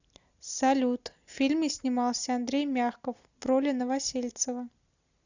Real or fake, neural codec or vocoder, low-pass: real; none; 7.2 kHz